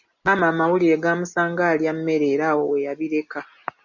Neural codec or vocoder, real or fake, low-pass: none; real; 7.2 kHz